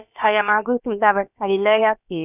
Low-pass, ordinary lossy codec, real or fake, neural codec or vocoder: 3.6 kHz; none; fake; codec, 16 kHz, about 1 kbps, DyCAST, with the encoder's durations